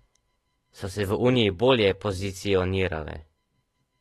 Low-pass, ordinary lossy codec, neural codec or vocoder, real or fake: 19.8 kHz; AAC, 32 kbps; autoencoder, 48 kHz, 128 numbers a frame, DAC-VAE, trained on Japanese speech; fake